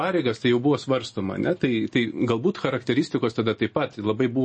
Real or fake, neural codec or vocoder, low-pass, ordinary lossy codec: real; none; 9.9 kHz; MP3, 32 kbps